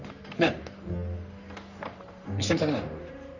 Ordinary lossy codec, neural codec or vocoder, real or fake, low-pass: none; codec, 44.1 kHz, 3.4 kbps, Pupu-Codec; fake; 7.2 kHz